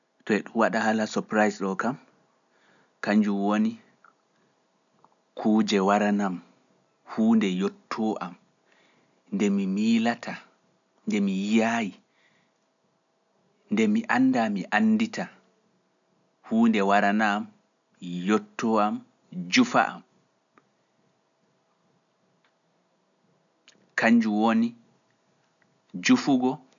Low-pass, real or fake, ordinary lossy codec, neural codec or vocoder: 7.2 kHz; real; none; none